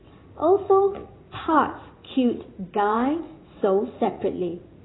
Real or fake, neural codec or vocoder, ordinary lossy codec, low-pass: fake; vocoder, 44.1 kHz, 80 mel bands, Vocos; AAC, 16 kbps; 7.2 kHz